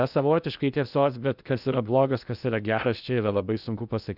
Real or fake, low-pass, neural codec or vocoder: fake; 5.4 kHz; codec, 16 kHz in and 24 kHz out, 0.6 kbps, FocalCodec, streaming, 2048 codes